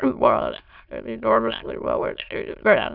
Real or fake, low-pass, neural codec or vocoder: fake; 5.4 kHz; autoencoder, 22.05 kHz, a latent of 192 numbers a frame, VITS, trained on many speakers